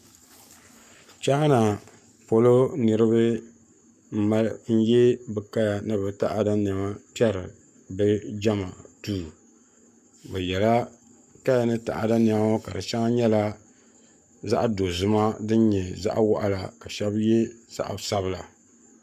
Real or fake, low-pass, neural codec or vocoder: fake; 14.4 kHz; codec, 44.1 kHz, 7.8 kbps, Pupu-Codec